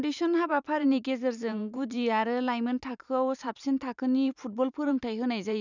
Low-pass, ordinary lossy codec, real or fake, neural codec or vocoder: 7.2 kHz; none; fake; vocoder, 44.1 kHz, 128 mel bands every 512 samples, BigVGAN v2